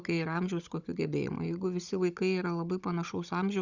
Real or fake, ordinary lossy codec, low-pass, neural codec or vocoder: fake; Opus, 64 kbps; 7.2 kHz; codec, 16 kHz, 16 kbps, FunCodec, trained on Chinese and English, 50 frames a second